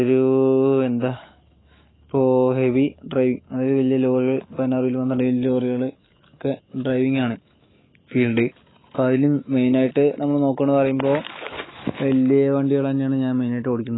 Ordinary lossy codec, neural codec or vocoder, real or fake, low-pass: AAC, 16 kbps; autoencoder, 48 kHz, 128 numbers a frame, DAC-VAE, trained on Japanese speech; fake; 7.2 kHz